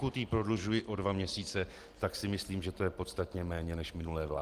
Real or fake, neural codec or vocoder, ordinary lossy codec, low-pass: real; none; Opus, 24 kbps; 14.4 kHz